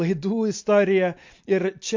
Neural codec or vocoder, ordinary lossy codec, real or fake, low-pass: none; MP3, 48 kbps; real; 7.2 kHz